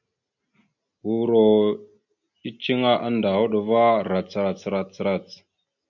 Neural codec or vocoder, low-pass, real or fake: none; 7.2 kHz; real